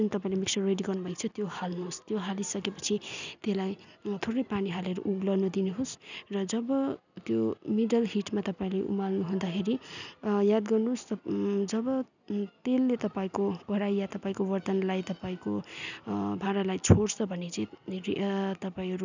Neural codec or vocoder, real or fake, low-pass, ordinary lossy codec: none; real; 7.2 kHz; none